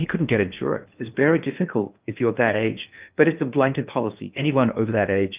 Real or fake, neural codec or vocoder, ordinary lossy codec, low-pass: fake; codec, 16 kHz in and 24 kHz out, 0.8 kbps, FocalCodec, streaming, 65536 codes; Opus, 24 kbps; 3.6 kHz